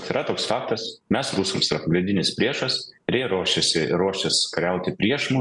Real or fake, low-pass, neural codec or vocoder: real; 10.8 kHz; none